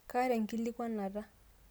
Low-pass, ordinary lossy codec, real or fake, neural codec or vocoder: none; none; real; none